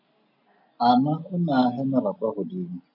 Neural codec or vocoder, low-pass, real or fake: none; 5.4 kHz; real